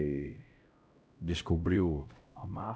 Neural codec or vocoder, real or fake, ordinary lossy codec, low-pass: codec, 16 kHz, 0.5 kbps, X-Codec, HuBERT features, trained on LibriSpeech; fake; none; none